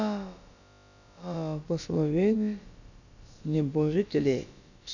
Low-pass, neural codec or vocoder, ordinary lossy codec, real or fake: 7.2 kHz; codec, 16 kHz, about 1 kbps, DyCAST, with the encoder's durations; none; fake